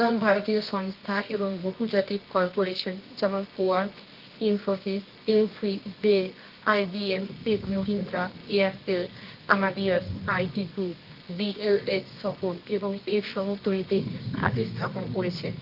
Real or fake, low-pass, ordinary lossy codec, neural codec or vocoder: fake; 5.4 kHz; Opus, 24 kbps; codec, 24 kHz, 0.9 kbps, WavTokenizer, medium music audio release